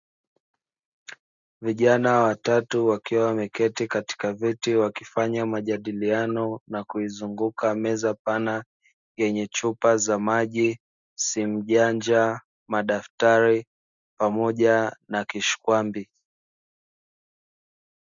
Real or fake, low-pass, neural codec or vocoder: real; 7.2 kHz; none